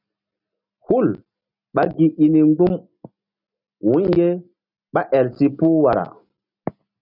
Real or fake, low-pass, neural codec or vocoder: real; 5.4 kHz; none